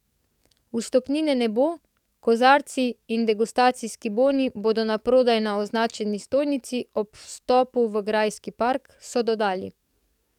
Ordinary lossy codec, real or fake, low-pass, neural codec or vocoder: none; fake; 19.8 kHz; codec, 44.1 kHz, 7.8 kbps, DAC